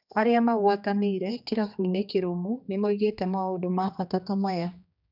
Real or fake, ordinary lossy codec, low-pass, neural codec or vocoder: fake; none; 5.4 kHz; codec, 16 kHz, 2 kbps, X-Codec, HuBERT features, trained on general audio